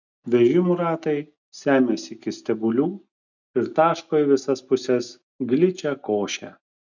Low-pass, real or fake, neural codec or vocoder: 7.2 kHz; real; none